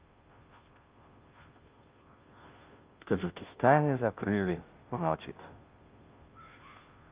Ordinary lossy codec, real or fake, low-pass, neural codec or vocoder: Opus, 24 kbps; fake; 3.6 kHz; codec, 16 kHz, 0.5 kbps, FunCodec, trained on Chinese and English, 25 frames a second